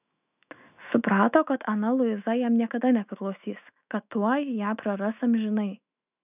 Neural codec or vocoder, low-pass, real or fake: autoencoder, 48 kHz, 128 numbers a frame, DAC-VAE, trained on Japanese speech; 3.6 kHz; fake